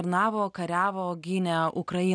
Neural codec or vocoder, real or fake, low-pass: none; real; 9.9 kHz